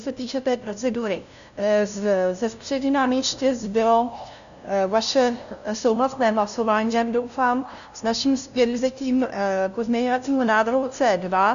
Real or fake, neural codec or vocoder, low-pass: fake; codec, 16 kHz, 0.5 kbps, FunCodec, trained on LibriTTS, 25 frames a second; 7.2 kHz